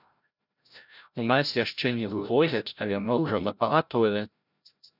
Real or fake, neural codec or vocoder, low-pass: fake; codec, 16 kHz, 0.5 kbps, FreqCodec, larger model; 5.4 kHz